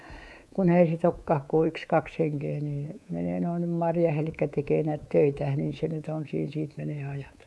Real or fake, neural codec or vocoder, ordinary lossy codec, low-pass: fake; codec, 24 kHz, 3.1 kbps, DualCodec; none; none